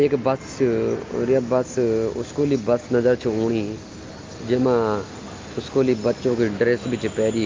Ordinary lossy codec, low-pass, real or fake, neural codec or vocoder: Opus, 24 kbps; 7.2 kHz; real; none